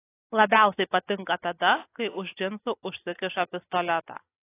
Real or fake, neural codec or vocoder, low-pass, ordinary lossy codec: real; none; 3.6 kHz; AAC, 24 kbps